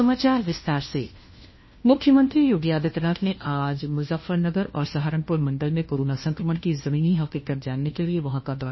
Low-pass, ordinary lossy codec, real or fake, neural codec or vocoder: 7.2 kHz; MP3, 24 kbps; fake; codec, 16 kHz, 1 kbps, FunCodec, trained on LibriTTS, 50 frames a second